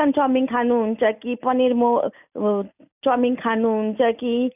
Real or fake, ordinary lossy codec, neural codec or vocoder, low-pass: real; none; none; 3.6 kHz